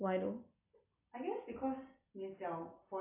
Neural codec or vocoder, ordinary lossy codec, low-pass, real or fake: none; AAC, 32 kbps; 3.6 kHz; real